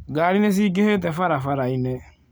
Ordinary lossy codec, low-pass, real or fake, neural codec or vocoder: none; none; real; none